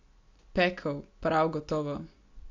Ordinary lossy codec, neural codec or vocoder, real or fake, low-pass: AAC, 48 kbps; none; real; 7.2 kHz